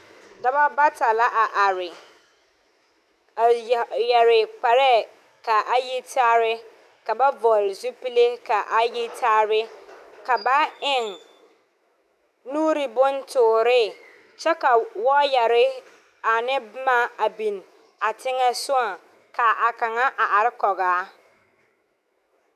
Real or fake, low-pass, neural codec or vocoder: fake; 14.4 kHz; autoencoder, 48 kHz, 128 numbers a frame, DAC-VAE, trained on Japanese speech